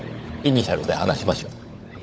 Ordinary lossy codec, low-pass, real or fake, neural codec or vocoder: none; none; fake; codec, 16 kHz, 16 kbps, FunCodec, trained on LibriTTS, 50 frames a second